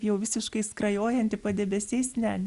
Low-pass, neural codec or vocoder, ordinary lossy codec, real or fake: 10.8 kHz; none; Opus, 64 kbps; real